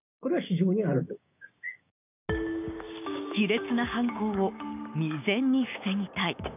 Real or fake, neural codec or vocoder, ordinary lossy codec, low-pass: fake; codec, 16 kHz, 6 kbps, DAC; none; 3.6 kHz